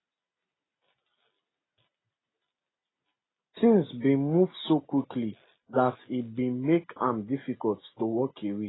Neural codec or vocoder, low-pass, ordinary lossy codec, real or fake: none; 7.2 kHz; AAC, 16 kbps; real